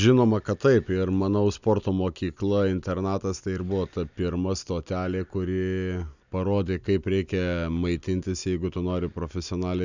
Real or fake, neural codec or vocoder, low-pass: real; none; 7.2 kHz